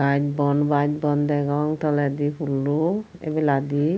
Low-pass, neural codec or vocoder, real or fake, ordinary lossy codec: none; none; real; none